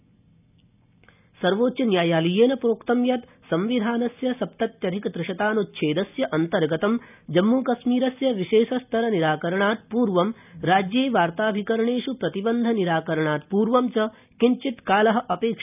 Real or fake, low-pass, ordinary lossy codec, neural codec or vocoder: real; 3.6 kHz; none; none